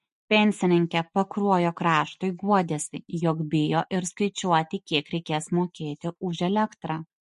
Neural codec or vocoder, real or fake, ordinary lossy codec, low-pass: none; real; MP3, 48 kbps; 14.4 kHz